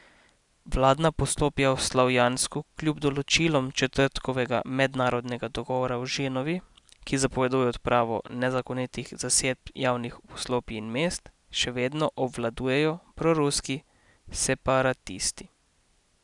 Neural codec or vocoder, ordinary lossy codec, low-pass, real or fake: none; none; 10.8 kHz; real